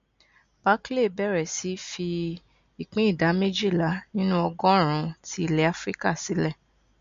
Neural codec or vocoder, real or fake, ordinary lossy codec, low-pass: none; real; MP3, 48 kbps; 7.2 kHz